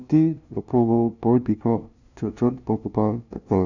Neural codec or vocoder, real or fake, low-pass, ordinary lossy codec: codec, 16 kHz, 0.5 kbps, FunCodec, trained on LibriTTS, 25 frames a second; fake; 7.2 kHz; none